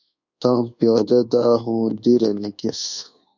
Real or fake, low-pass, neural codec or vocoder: fake; 7.2 kHz; codec, 24 kHz, 1.2 kbps, DualCodec